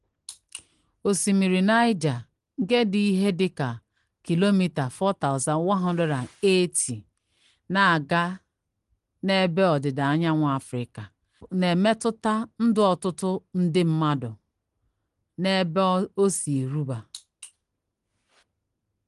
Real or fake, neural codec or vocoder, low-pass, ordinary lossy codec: real; none; 10.8 kHz; Opus, 24 kbps